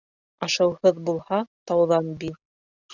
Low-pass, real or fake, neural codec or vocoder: 7.2 kHz; real; none